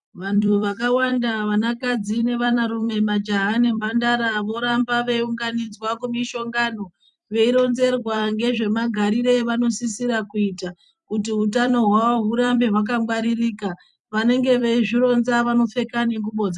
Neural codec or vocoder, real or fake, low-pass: vocoder, 44.1 kHz, 128 mel bands every 256 samples, BigVGAN v2; fake; 10.8 kHz